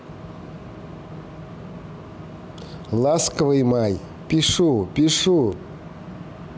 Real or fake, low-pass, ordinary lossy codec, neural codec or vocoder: real; none; none; none